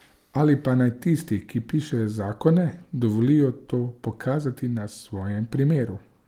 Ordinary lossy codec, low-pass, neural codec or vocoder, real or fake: Opus, 24 kbps; 19.8 kHz; none; real